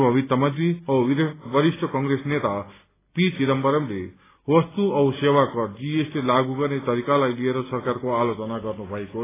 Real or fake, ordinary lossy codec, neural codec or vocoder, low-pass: real; AAC, 16 kbps; none; 3.6 kHz